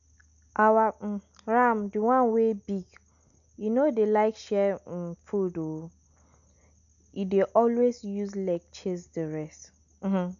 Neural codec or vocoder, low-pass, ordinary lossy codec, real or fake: none; 7.2 kHz; none; real